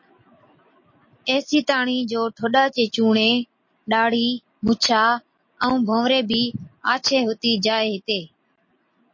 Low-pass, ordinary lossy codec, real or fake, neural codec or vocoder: 7.2 kHz; MP3, 32 kbps; real; none